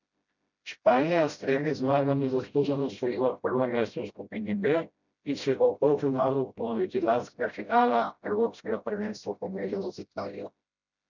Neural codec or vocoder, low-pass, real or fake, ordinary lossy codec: codec, 16 kHz, 0.5 kbps, FreqCodec, smaller model; 7.2 kHz; fake; none